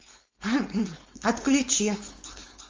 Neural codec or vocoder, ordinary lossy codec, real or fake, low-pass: codec, 16 kHz, 4.8 kbps, FACodec; Opus, 32 kbps; fake; 7.2 kHz